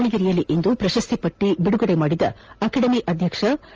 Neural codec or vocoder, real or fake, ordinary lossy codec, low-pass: none; real; Opus, 24 kbps; 7.2 kHz